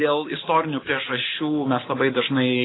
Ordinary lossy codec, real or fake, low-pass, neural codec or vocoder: AAC, 16 kbps; real; 7.2 kHz; none